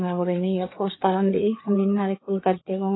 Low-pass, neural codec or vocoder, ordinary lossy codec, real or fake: 7.2 kHz; codec, 16 kHz, 4 kbps, FreqCodec, larger model; AAC, 16 kbps; fake